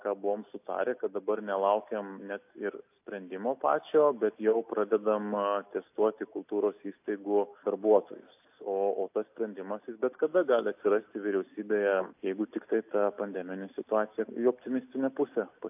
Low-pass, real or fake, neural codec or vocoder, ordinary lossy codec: 3.6 kHz; real; none; AAC, 32 kbps